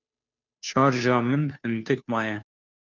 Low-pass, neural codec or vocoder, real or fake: 7.2 kHz; codec, 16 kHz, 2 kbps, FunCodec, trained on Chinese and English, 25 frames a second; fake